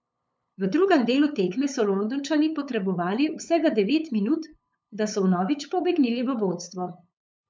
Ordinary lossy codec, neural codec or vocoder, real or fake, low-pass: none; codec, 16 kHz, 8 kbps, FunCodec, trained on LibriTTS, 25 frames a second; fake; none